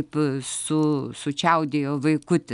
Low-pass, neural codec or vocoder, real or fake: 10.8 kHz; none; real